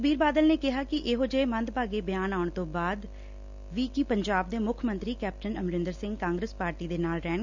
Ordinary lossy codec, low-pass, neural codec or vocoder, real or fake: none; 7.2 kHz; none; real